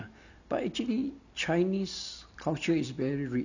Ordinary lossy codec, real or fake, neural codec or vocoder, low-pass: none; real; none; 7.2 kHz